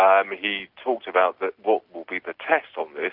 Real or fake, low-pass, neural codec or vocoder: real; 5.4 kHz; none